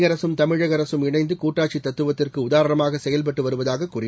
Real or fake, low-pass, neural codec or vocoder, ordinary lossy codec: real; none; none; none